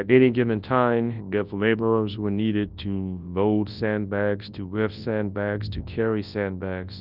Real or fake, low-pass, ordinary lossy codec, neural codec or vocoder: fake; 5.4 kHz; Opus, 24 kbps; codec, 24 kHz, 0.9 kbps, WavTokenizer, large speech release